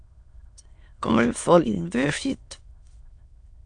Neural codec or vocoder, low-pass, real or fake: autoencoder, 22.05 kHz, a latent of 192 numbers a frame, VITS, trained on many speakers; 9.9 kHz; fake